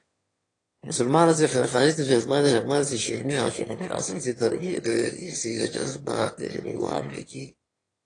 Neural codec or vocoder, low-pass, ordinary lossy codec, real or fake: autoencoder, 22.05 kHz, a latent of 192 numbers a frame, VITS, trained on one speaker; 9.9 kHz; AAC, 32 kbps; fake